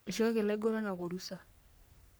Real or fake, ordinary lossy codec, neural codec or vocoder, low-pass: fake; none; codec, 44.1 kHz, 3.4 kbps, Pupu-Codec; none